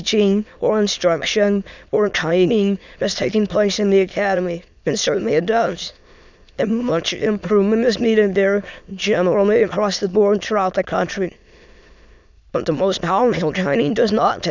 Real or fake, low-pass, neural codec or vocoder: fake; 7.2 kHz; autoencoder, 22.05 kHz, a latent of 192 numbers a frame, VITS, trained on many speakers